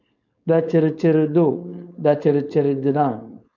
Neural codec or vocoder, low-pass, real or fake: codec, 16 kHz, 4.8 kbps, FACodec; 7.2 kHz; fake